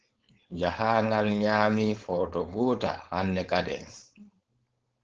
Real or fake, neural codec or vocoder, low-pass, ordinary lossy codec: fake; codec, 16 kHz, 4.8 kbps, FACodec; 7.2 kHz; Opus, 16 kbps